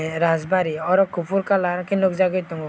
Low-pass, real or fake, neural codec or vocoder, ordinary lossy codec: none; real; none; none